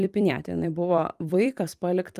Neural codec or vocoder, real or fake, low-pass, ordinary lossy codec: vocoder, 44.1 kHz, 128 mel bands every 256 samples, BigVGAN v2; fake; 14.4 kHz; Opus, 32 kbps